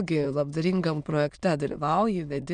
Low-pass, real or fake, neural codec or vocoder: 9.9 kHz; fake; autoencoder, 22.05 kHz, a latent of 192 numbers a frame, VITS, trained on many speakers